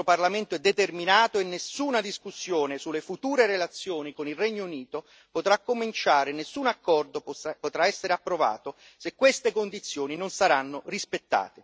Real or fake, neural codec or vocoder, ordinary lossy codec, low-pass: real; none; none; none